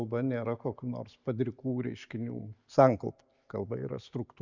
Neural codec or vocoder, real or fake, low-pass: none; real; 7.2 kHz